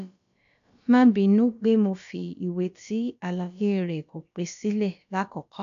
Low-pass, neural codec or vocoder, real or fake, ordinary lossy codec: 7.2 kHz; codec, 16 kHz, about 1 kbps, DyCAST, with the encoder's durations; fake; AAC, 96 kbps